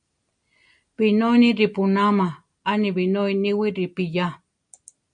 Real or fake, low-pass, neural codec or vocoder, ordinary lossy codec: real; 9.9 kHz; none; MP3, 64 kbps